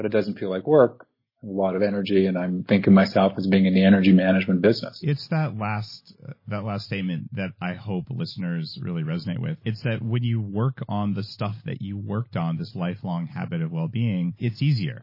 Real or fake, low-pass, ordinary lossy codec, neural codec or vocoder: real; 5.4 kHz; MP3, 24 kbps; none